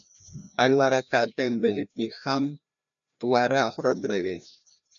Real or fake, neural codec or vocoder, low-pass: fake; codec, 16 kHz, 1 kbps, FreqCodec, larger model; 7.2 kHz